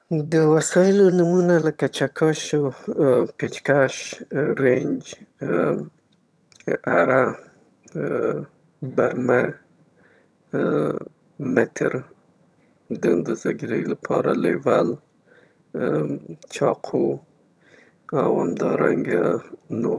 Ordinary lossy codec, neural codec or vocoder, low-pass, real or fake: none; vocoder, 22.05 kHz, 80 mel bands, HiFi-GAN; none; fake